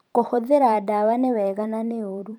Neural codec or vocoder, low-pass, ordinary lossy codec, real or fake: vocoder, 44.1 kHz, 128 mel bands every 512 samples, BigVGAN v2; 19.8 kHz; none; fake